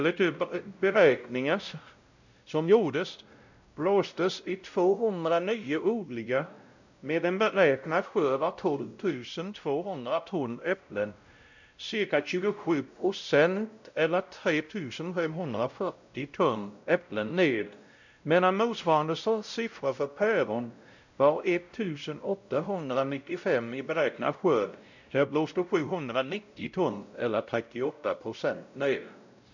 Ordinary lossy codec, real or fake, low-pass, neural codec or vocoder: none; fake; 7.2 kHz; codec, 16 kHz, 0.5 kbps, X-Codec, WavLM features, trained on Multilingual LibriSpeech